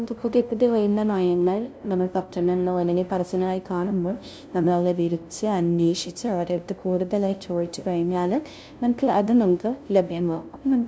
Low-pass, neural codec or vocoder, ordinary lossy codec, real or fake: none; codec, 16 kHz, 0.5 kbps, FunCodec, trained on LibriTTS, 25 frames a second; none; fake